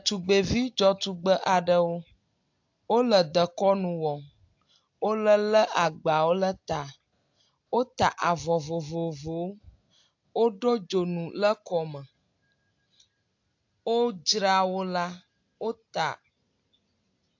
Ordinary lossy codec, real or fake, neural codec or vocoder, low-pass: AAC, 48 kbps; real; none; 7.2 kHz